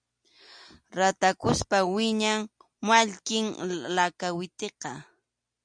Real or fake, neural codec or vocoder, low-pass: real; none; 9.9 kHz